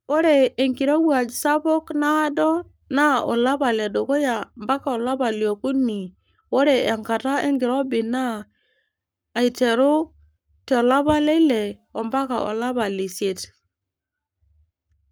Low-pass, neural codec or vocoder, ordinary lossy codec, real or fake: none; codec, 44.1 kHz, 7.8 kbps, Pupu-Codec; none; fake